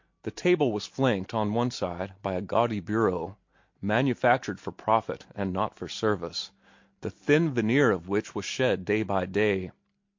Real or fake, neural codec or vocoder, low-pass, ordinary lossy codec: real; none; 7.2 kHz; MP3, 48 kbps